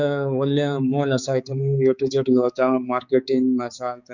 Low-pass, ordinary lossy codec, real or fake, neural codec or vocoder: 7.2 kHz; none; fake; codec, 16 kHz, 4 kbps, X-Codec, HuBERT features, trained on balanced general audio